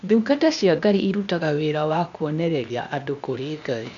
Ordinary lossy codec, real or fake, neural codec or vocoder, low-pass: none; fake; codec, 16 kHz, 0.8 kbps, ZipCodec; 7.2 kHz